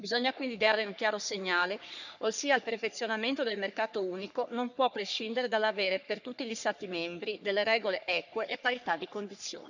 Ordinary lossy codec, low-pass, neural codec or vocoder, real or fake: none; 7.2 kHz; codec, 44.1 kHz, 3.4 kbps, Pupu-Codec; fake